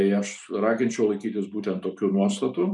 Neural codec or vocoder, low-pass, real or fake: none; 10.8 kHz; real